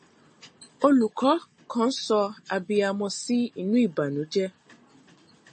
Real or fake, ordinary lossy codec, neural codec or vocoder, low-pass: real; MP3, 32 kbps; none; 10.8 kHz